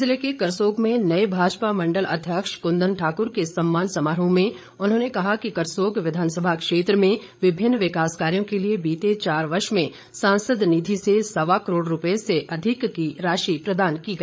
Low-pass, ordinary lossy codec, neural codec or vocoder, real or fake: none; none; codec, 16 kHz, 8 kbps, FreqCodec, larger model; fake